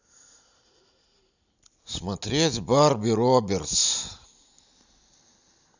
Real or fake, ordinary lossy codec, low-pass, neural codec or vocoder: real; none; 7.2 kHz; none